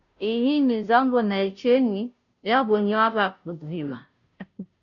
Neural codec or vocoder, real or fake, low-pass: codec, 16 kHz, 0.5 kbps, FunCodec, trained on Chinese and English, 25 frames a second; fake; 7.2 kHz